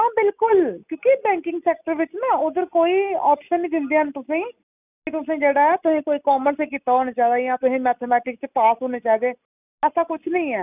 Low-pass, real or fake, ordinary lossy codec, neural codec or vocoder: 3.6 kHz; real; none; none